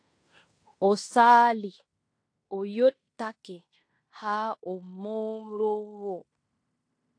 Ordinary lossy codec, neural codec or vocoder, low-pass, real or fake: AAC, 48 kbps; codec, 16 kHz in and 24 kHz out, 0.9 kbps, LongCat-Audio-Codec, fine tuned four codebook decoder; 9.9 kHz; fake